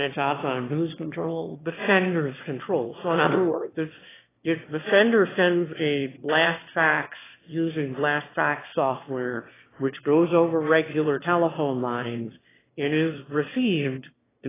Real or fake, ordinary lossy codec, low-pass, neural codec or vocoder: fake; AAC, 16 kbps; 3.6 kHz; autoencoder, 22.05 kHz, a latent of 192 numbers a frame, VITS, trained on one speaker